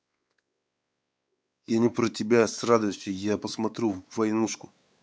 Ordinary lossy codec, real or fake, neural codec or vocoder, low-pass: none; fake; codec, 16 kHz, 4 kbps, X-Codec, WavLM features, trained on Multilingual LibriSpeech; none